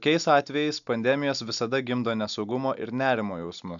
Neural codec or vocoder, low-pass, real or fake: none; 7.2 kHz; real